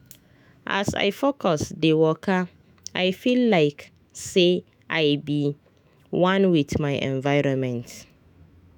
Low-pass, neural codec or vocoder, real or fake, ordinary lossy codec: none; autoencoder, 48 kHz, 128 numbers a frame, DAC-VAE, trained on Japanese speech; fake; none